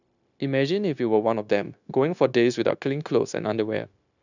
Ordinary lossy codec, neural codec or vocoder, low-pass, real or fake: none; codec, 16 kHz, 0.9 kbps, LongCat-Audio-Codec; 7.2 kHz; fake